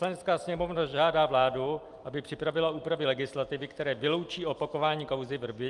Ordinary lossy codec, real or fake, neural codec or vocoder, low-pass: Opus, 32 kbps; real; none; 10.8 kHz